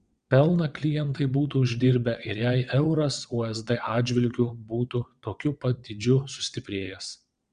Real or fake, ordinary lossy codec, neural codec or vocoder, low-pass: fake; Opus, 64 kbps; vocoder, 22.05 kHz, 80 mel bands, WaveNeXt; 9.9 kHz